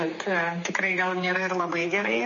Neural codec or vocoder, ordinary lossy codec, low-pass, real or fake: codec, 16 kHz, 4 kbps, X-Codec, HuBERT features, trained on general audio; MP3, 32 kbps; 7.2 kHz; fake